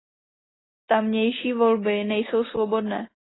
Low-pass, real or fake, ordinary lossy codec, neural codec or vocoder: 7.2 kHz; real; AAC, 16 kbps; none